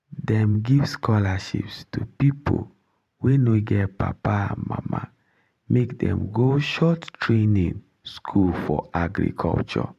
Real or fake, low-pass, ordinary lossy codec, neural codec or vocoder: fake; 14.4 kHz; MP3, 96 kbps; vocoder, 44.1 kHz, 128 mel bands every 512 samples, BigVGAN v2